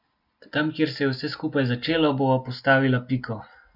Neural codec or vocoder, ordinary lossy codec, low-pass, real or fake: none; none; 5.4 kHz; real